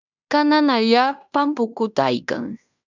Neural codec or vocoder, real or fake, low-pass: codec, 16 kHz in and 24 kHz out, 0.9 kbps, LongCat-Audio-Codec, fine tuned four codebook decoder; fake; 7.2 kHz